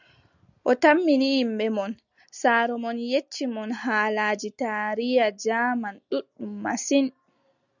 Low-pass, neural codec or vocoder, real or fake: 7.2 kHz; none; real